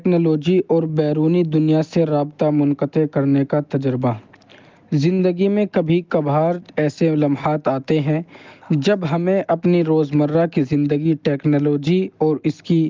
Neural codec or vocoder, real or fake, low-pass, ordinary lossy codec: none; real; 7.2 kHz; Opus, 32 kbps